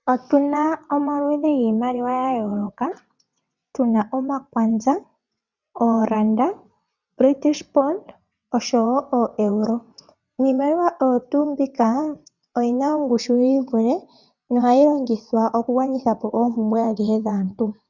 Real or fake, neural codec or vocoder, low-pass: fake; vocoder, 22.05 kHz, 80 mel bands, WaveNeXt; 7.2 kHz